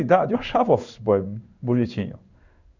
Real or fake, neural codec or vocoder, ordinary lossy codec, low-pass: fake; codec, 16 kHz in and 24 kHz out, 1 kbps, XY-Tokenizer; Opus, 64 kbps; 7.2 kHz